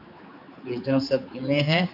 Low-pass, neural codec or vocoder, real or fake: 5.4 kHz; codec, 16 kHz, 4 kbps, X-Codec, HuBERT features, trained on general audio; fake